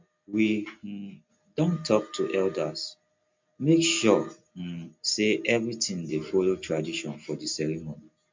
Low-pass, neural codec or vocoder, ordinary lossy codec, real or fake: 7.2 kHz; none; MP3, 64 kbps; real